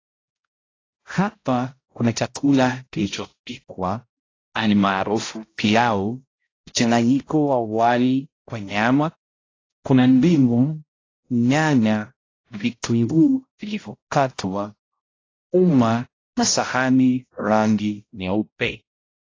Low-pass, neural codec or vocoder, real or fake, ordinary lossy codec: 7.2 kHz; codec, 16 kHz, 0.5 kbps, X-Codec, HuBERT features, trained on balanced general audio; fake; AAC, 32 kbps